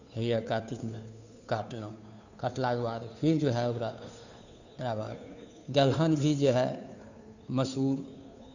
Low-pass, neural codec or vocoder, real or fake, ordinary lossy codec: 7.2 kHz; codec, 16 kHz, 2 kbps, FunCodec, trained on Chinese and English, 25 frames a second; fake; none